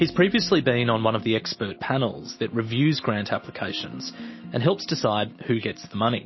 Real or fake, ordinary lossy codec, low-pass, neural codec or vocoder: real; MP3, 24 kbps; 7.2 kHz; none